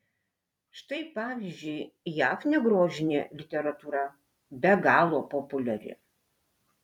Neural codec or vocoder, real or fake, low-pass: vocoder, 44.1 kHz, 128 mel bands every 256 samples, BigVGAN v2; fake; 19.8 kHz